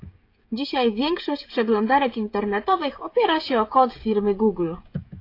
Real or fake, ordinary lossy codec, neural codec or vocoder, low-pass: fake; AAC, 32 kbps; codec, 16 kHz, 16 kbps, FreqCodec, smaller model; 5.4 kHz